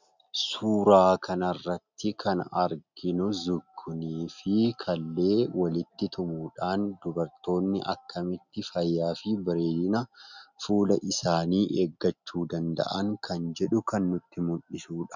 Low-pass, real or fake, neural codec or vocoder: 7.2 kHz; real; none